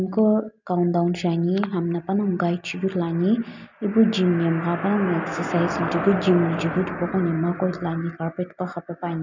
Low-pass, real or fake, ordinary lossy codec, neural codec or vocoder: 7.2 kHz; real; none; none